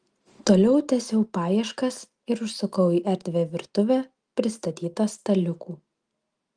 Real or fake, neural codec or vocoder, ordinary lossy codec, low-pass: real; none; Opus, 32 kbps; 9.9 kHz